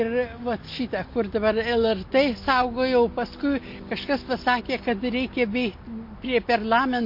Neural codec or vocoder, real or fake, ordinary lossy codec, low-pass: none; real; MP3, 32 kbps; 5.4 kHz